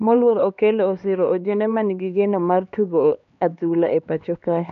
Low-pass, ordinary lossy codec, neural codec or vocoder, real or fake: 7.2 kHz; AAC, 96 kbps; codec, 16 kHz, 2 kbps, X-Codec, HuBERT features, trained on LibriSpeech; fake